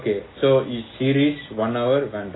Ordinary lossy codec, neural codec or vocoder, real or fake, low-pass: AAC, 16 kbps; none; real; 7.2 kHz